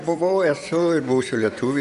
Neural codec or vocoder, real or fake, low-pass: vocoder, 24 kHz, 100 mel bands, Vocos; fake; 10.8 kHz